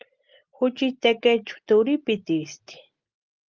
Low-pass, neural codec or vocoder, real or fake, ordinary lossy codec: 7.2 kHz; none; real; Opus, 32 kbps